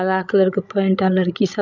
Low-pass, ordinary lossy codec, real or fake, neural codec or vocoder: 7.2 kHz; none; fake; codec, 16 kHz, 16 kbps, FreqCodec, larger model